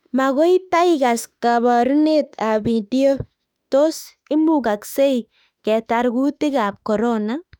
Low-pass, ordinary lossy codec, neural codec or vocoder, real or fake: 19.8 kHz; none; autoencoder, 48 kHz, 32 numbers a frame, DAC-VAE, trained on Japanese speech; fake